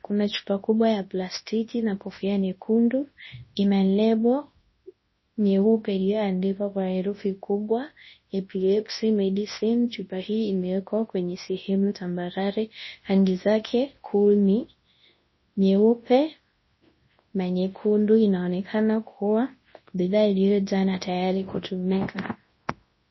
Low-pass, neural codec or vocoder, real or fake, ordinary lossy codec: 7.2 kHz; codec, 24 kHz, 0.9 kbps, WavTokenizer, large speech release; fake; MP3, 24 kbps